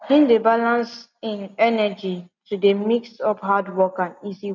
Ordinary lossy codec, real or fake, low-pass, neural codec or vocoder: none; real; 7.2 kHz; none